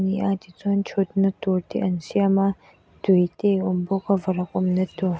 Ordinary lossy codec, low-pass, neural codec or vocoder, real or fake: none; none; none; real